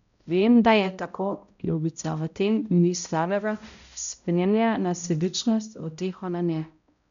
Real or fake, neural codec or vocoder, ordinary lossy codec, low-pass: fake; codec, 16 kHz, 0.5 kbps, X-Codec, HuBERT features, trained on balanced general audio; none; 7.2 kHz